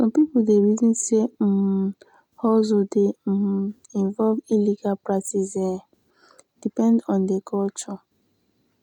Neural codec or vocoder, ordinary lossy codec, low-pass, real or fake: none; none; 19.8 kHz; real